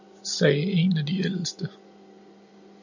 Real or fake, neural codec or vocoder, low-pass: real; none; 7.2 kHz